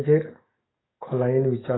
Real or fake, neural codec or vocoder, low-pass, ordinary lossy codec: fake; codec, 44.1 kHz, 7.8 kbps, DAC; 7.2 kHz; AAC, 16 kbps